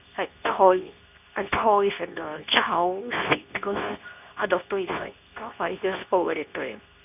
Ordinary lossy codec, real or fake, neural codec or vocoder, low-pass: none; fake; codec, 24 kHz, 0.9 kbps, WavTokenizer, medium speech release version 2; 3.6 kHz